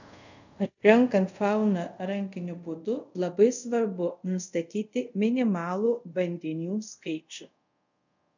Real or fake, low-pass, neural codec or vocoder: fake; 7.2 kHz; codec, 24 kHz, 0.5 kbps, DualCodec